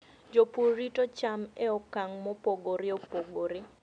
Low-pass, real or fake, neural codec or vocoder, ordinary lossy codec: 9.9 kHz; real; none; none